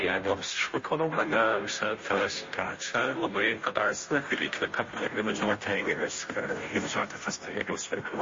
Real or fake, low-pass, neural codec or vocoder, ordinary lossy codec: fake; 7.2 kHz; codec, 16 kHz, 0.5 kbps, FunCodec, trained on Chinese and English, 25 frames a second; MP3, 32 kbps